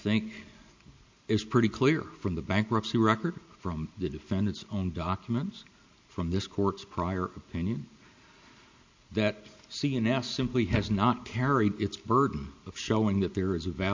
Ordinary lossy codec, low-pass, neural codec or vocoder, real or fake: MP3, 64 kbps; 7.2 kHz; none; real